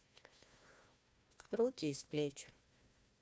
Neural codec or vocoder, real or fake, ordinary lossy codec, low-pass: codec, 16 kHz, 1 kbps, FunCodec, trained on Chinese and English, 50 frames a second; fake; none; none